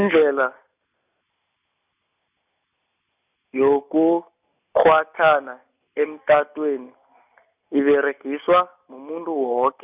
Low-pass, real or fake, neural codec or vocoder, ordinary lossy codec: 3.6 kHz; real; none; none